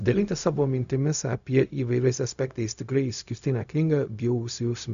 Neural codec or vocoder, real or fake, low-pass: codec, 16 kHz, 0.4 kbps, LongCat-Audio-Codec; fake; 7.2 kHz